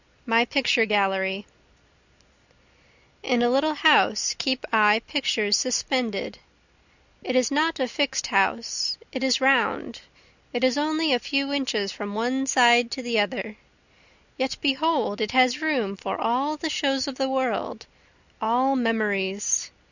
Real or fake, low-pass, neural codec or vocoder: real; 7.2 kHz; none